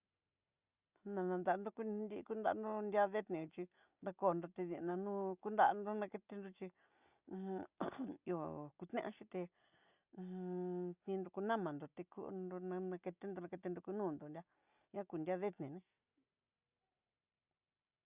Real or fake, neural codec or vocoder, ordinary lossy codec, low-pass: real; none; Opus, 64 kbps; 3.6 kHz